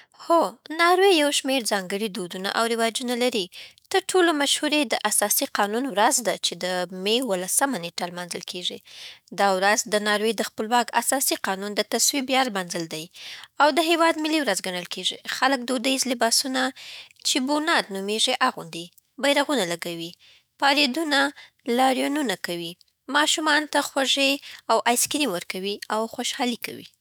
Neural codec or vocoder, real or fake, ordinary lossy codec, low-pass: autoencoder, 48 kHz, 128 numbers a frame, DAC-VAE, trained on Japanese speech; fake; none; none